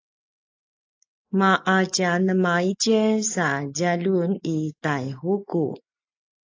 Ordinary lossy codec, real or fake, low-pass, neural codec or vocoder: AAC, 48 kbps; real; 7.2 kHz; none